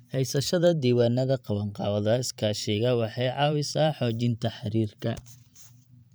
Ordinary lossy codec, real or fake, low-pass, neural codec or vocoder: none; fake; none; vocoder, 44.1 kHz, 128 mel bands every 512 samples, BigVGAN v2